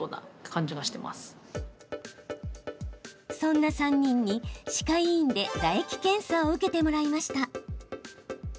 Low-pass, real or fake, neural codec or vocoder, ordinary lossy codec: none; real; none; none